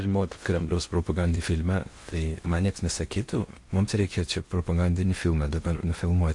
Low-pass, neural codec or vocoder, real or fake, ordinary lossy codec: 10.8 kHz; codec, 16 kHz in and 24 kHz out, 0.8 kbps, FocalCodec, streaming, 65536 codes; fake; MP3, 48 kbps